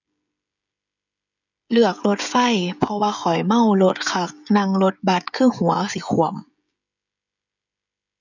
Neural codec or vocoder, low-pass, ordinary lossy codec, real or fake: codec, 16 kHz, 16 kbps, FreqCodec, smaller model; 7.2 kHz; none; fake